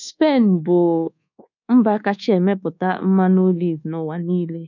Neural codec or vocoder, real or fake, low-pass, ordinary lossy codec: codec, 24 kHz, 1.2 kbps, DualCodec; fake; 7.2 kHz; none